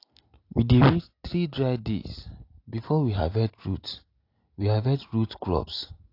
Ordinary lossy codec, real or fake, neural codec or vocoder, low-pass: AAC, 32 kbps; real; none; 5.4 kHz